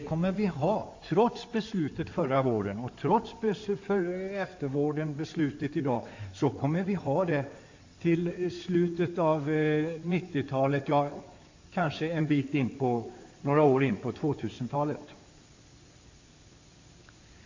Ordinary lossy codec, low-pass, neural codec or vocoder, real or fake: AAC, 48 kbps; 7.2 kHz; codec, 16 kHz in and 24 kHz out, 2.2 kbps, FireRedTTS-2 codec; fake